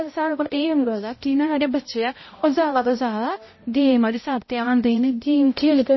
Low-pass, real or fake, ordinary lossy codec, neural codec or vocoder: 7.2 kHz; fake; MP3, 24 kbps; codec, 16 kHz, 0.5 kbps, X-Codec, HuBERT features, trained on balanced general audio